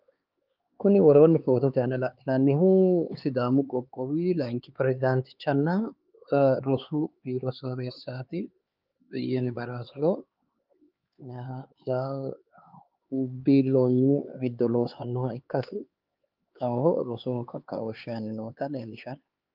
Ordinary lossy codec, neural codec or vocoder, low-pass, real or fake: Opus, 24 kbps; codec, 16 kHz, 4 kbps, X-Codec, HuBERT features, trained on LibriSpeech; 5.4 kHz; fake